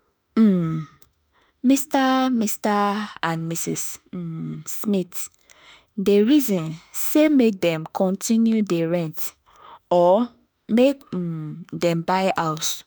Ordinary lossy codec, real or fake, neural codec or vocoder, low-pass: none; fake; autoencoder, 48 kHz, 32 numbers a frame, DAC-VAE, trained on Japanese speech; none